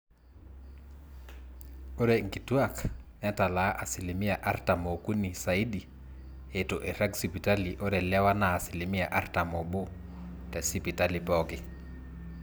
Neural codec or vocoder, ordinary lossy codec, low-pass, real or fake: none; none; none; real